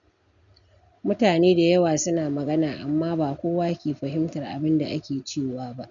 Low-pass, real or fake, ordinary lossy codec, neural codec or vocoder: 7.2 kHz; real; none; none